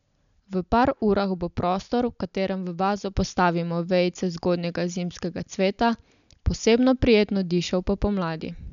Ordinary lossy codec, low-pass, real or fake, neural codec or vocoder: none; 7.2 kHz; real; none